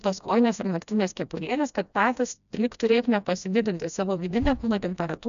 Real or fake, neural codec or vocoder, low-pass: fake; codec, 16 kHz, 1 kbps, FreqCodec, smaller model; 7.2 kHz